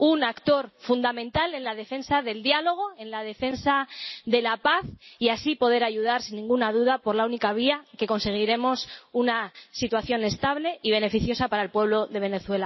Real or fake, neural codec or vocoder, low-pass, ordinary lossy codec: real; none; 7.2 kHz; MP3, 24 kbps